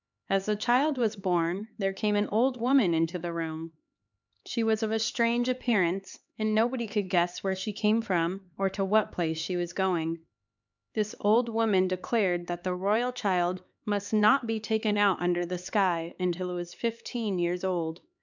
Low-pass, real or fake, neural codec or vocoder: 7.2 kHz; fake; codec, 16 kHz, 4 kbps, X-Codec, HuBERT features, trained on LibriSpeech